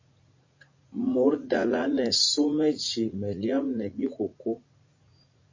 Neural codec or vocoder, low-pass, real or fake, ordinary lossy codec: vocoder, 44.1 kHz, 80 mel bands, Vocos; 7.2 kHz; fake; MP3, 32 kbps